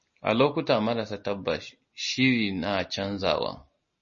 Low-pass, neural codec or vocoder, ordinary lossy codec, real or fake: 7.2 kHz; none; MP3, 32 kbps; real